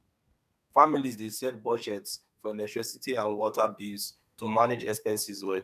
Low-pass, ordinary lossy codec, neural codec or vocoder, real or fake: 14.4 kHz; none; codec, 32 kHz, 1.9 kbps, SNAC; fake